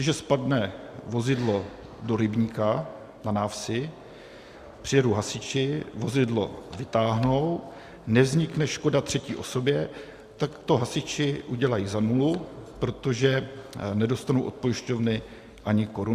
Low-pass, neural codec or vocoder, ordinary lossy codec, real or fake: 14.4 kHz; none; Opus, 64 kbps; real